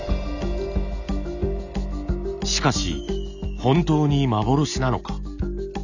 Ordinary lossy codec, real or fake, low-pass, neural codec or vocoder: none; real; 7.2 kHz; none